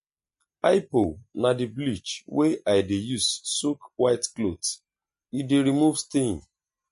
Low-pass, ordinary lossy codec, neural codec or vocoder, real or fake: 10.8 kHz; MP3, 48 kbps; none; real